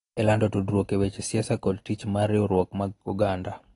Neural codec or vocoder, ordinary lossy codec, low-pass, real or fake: none; AAC, 32 kbps; 10.8 kHz; real